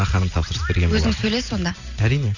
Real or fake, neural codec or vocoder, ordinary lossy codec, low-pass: real; none; none; 7.2 kHz